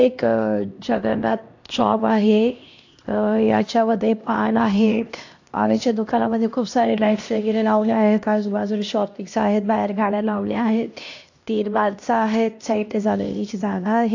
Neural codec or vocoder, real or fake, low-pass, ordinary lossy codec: codec, 16 kHz, 1 kbps, X-Codec, HuBERT features, trained on LibriSpeech; fake; 7.2 kHz; AAC, 48 kbps